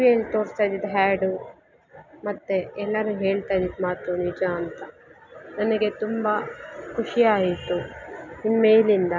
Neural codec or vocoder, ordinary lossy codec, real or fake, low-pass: none; none; real; 7.2 kHz